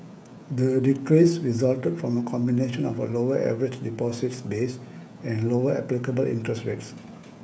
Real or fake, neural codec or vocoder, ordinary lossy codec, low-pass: fake; codec, 16 kHz, 16 kbps, FreqCodec, smaller model; none; none